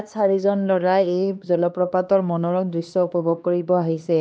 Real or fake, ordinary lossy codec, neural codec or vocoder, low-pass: fake; none; codec, 16 kHz, 2 kbps, X-Codec, HuBERT features, trained on LibriSpeech; none